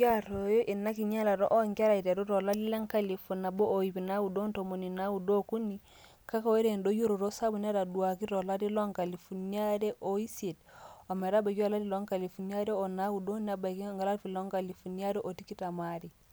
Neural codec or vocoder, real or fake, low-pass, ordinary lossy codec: none; real; none; none